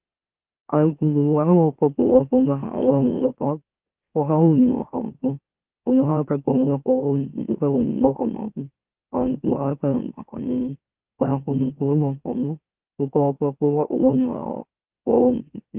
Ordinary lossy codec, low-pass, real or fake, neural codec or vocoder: Opus, 32 kbps; 3.6 kHz; fake; autoencoder, 44.1 kHz, a latent of 192 numbers a frame, MeloTTS